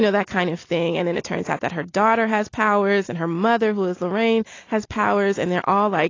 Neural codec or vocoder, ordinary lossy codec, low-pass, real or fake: none; AAC, 32 kbps; 7.2 kHz; real